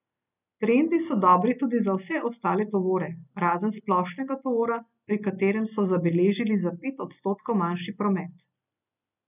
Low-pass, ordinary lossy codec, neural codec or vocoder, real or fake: 3.6 kHz; none; none; real